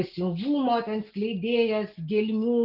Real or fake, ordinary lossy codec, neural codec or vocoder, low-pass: real; Opus, 24 kbps; none; 5.4 kHz